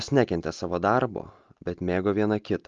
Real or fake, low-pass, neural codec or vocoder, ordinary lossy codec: real; 7.2 kHz; none; Opus, 24 kbps